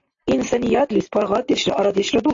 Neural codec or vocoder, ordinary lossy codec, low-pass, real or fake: none; AAC, 48 kbps; 7.2 kHz; real